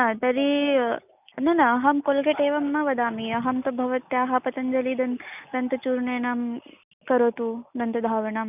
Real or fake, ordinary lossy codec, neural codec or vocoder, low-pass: real; none; none; 3.6 kHz